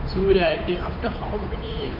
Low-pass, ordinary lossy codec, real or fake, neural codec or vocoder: 5.4 kHz; MP3, 32 kbps; fake; codec, 16 kHz, 8 kbps, FunCodec, trained on Chinese and English, 25 frames a second